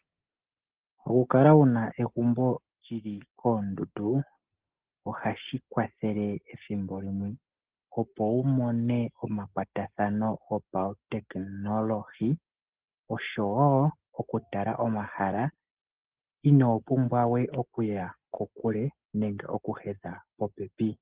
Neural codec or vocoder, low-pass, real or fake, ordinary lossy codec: none; 3.6 kHz; real; Opus, 16 kbps